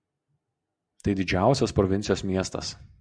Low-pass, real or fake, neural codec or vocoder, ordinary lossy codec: 9.9 kHz; real; none; Opus, 64 kbps